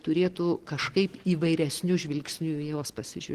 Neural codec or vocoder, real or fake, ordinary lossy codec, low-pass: none; real; Opus, 16 kbps; 14.4 kHz